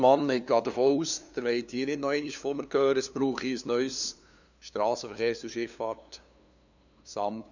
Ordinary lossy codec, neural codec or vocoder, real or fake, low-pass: none; codec, 16 kHz, 2 kbps, FunCodec, trained on LibriTTS, 25 frames a second; fake; 7.2 kHz